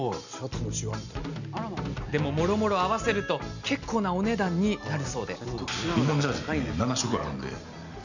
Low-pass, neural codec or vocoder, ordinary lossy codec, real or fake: 7.2 kHz; none; none; real